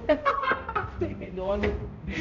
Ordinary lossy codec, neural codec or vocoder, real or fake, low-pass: none; codec, 16 kHz, 0.5 kbps, X-Codec, HuBERT features, trained on balanced general audio; fake; 7.2 kHz